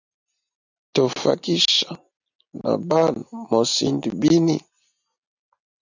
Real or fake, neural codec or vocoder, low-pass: real; none; 7.2 kHz